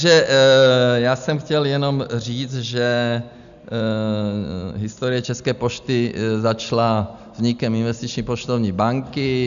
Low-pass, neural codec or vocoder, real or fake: 7.2 kHz; none; real